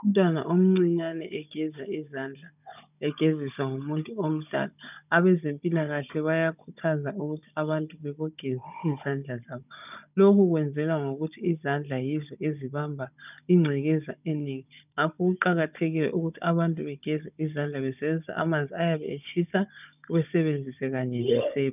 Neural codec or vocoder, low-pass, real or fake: codec, 16 kHz, 16 kbps, FunCodec, trained on Chinese and English, 50 frames a second; 3.6 kHz; fake